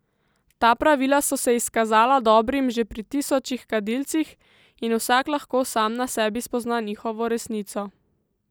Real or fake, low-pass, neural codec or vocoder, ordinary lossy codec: real; none; none; none